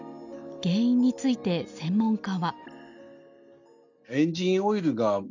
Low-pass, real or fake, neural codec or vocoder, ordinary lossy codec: 7.2 kHz; real; none; none